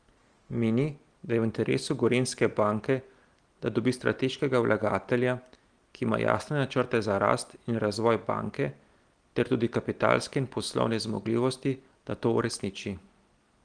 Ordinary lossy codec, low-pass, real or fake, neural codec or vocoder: Opus, 24 kbps; 9.9 kHz; real; none